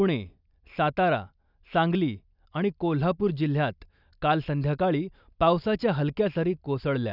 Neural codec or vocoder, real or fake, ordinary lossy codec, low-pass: none; real; none; 5.4 kHz